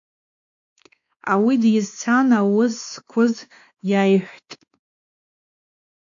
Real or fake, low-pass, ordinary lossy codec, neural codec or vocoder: fake; 7.2 kHz; AAC, 64 kbps; codec, 16 kHz, 2 kbps, X-Codec, WavLM features, trained on Multilingual LibriSpeech